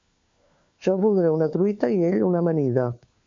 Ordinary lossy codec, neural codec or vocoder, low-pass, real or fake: MP3, 48 kbps; codec, 16 kHz, 4 kbps, FunCodec, trained on LibriTTS, 50 frames a second; 7.2 kHz; fake